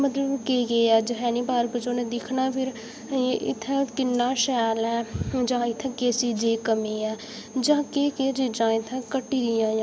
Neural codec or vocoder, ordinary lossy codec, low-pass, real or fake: none; none; none; real